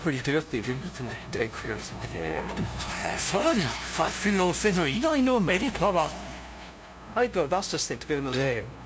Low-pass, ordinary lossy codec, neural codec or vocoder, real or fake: none; none; codec, 16 kHz, 0.5 kbps, FunCodec, trained on LibriTTS, 25 frames a second; fake